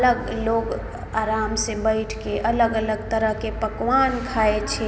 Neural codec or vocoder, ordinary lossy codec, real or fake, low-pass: none; none; real; none